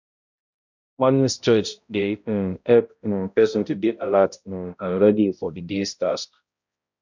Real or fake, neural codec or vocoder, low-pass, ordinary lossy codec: fake; codec, 16 kHz, 0.5 kbps, X-Codec, HuBERT features, trained on balanced general audio; 7.2 kHz; MP3, 64 kbps